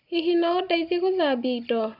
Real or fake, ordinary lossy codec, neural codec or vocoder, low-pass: real; none; none; 5.4 kHz